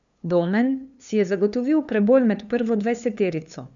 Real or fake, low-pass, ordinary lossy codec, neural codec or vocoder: fake; 7.2 kHz; none; codec, 16 kHz, 2 kbps, FunCodec, trained on LibriTTS, 25 frames a second